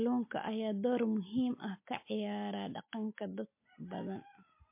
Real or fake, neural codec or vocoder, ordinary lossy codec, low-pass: real; none; MP3, 24 kbps; 3.6 kHz